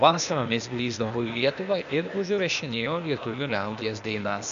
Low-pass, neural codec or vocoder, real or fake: 7.2 kHz; codec, 16 kHz, 0.8 kbps, ZipCodec; fake